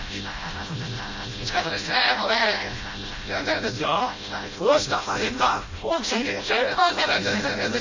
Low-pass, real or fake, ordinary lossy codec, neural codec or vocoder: 7.2 kHz; fake; MP3, 32 kbps; codec, 16 kHz, 0.5 kbps, FreqCodec, smaller model